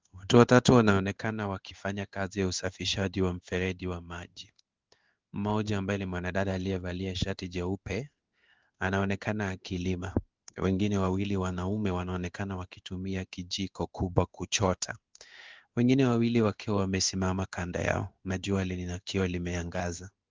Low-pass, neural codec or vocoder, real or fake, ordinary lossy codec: 7.2 kHz; codec, 16 kHz in and 24 kHz out, 1 kbps, XY-Tokenizer; fake; Opus, 32 kbps